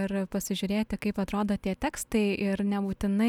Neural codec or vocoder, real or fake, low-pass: none; real; 19.8 kHz